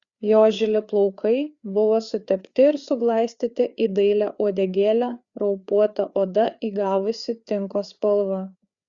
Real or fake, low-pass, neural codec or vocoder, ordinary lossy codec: fake; 7.2 kHz; codec, 16 kHz, 4 kbps, FreqCodec, larger model; Opus, 64 kbps